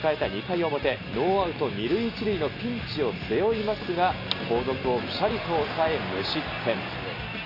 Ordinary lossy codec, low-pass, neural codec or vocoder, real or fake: AAC, 32 kbps; 5.4 kHz; none; real